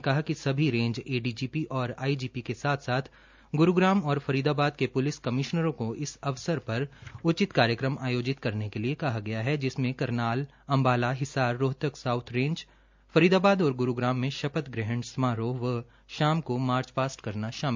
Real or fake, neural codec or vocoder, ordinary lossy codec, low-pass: real; none; MP3, 64 kbps; 7.2 kHz